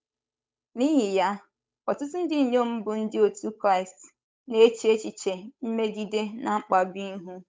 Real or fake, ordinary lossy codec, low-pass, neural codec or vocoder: fake; none; none; codec, 16 kHz, 8 kbps, FunCodec, trained on Chinese and English, 25 frames a second